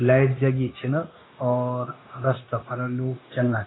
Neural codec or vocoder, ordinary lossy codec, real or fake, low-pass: codec, 16 kHz, 0.9 kbps, LongCat-Audio-Codec; AAC, 16 kbps; fake; 7.2 kHz